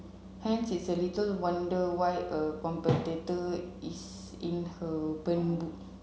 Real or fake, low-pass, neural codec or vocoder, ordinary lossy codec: real; none; none; none